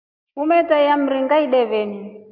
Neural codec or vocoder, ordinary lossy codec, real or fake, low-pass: none; MP3, 48 kbps; real; 5.4 kHz